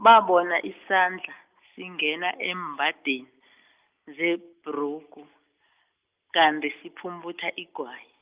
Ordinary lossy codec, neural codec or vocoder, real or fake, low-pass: Opus, 24 kbps; none; real; 3.6 kHz